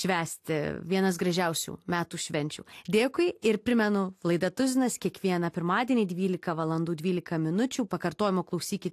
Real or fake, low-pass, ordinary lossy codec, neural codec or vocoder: real; 14.4 kHz; AAC, 64 kbps; none